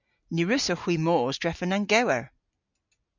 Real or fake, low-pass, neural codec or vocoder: real; 7.2 kHz; none